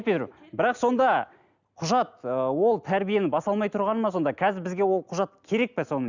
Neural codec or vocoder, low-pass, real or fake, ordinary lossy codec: none; 7.2 kHz; real; none